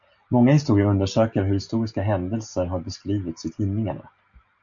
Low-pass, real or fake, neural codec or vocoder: 7.2 kHz; real; none